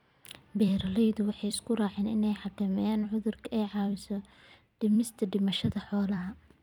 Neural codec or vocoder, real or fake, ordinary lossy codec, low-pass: vocoder, 44.1 kHz, 128 mel bands every 256 samples, BigVGAN v2; fake; none; 19.8 kHz